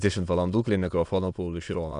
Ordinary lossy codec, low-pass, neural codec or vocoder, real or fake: MP3, 96 kbps; 9.9 kHz; autoencoder, 22.05 kHz, a latent of 192 numbers a frame, VITS, trained on many speakers; fake